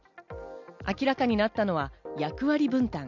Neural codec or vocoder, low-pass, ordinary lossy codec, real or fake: none; 7.2 kHz; none; real